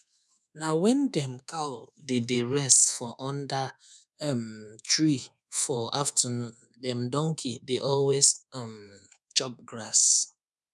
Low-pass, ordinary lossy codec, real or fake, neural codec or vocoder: none; none; fake; codec, 24 kHz, 1.2 kbps, DualCodec